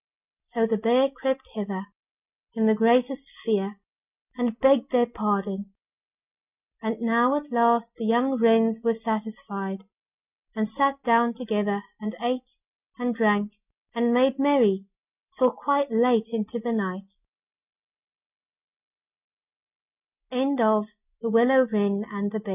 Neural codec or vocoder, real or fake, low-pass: none; real; 3.6 kHz